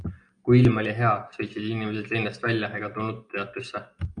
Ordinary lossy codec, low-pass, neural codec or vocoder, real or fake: MP3, 64 kbps; 9.9 kHz; none; real